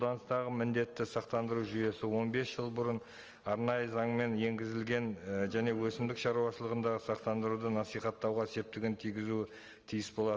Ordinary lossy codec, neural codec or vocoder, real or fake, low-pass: Opus, 24 kbps; none; real; 7.2 kHz